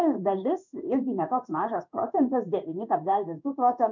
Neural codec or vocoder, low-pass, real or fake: codec, 16 kHz in and 24 kHz out, 1 kbps, XY-Tokenizer; 7.2 kHz; fake